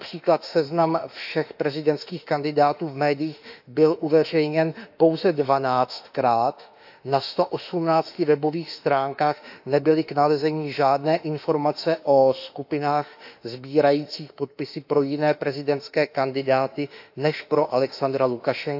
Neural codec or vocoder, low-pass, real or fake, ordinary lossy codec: autoencoder, 48 kHz, 32 numbers a frame, DAC-VAE, trained on Japanese speech; 5.4 kHz; fake; AAC, 48 kbps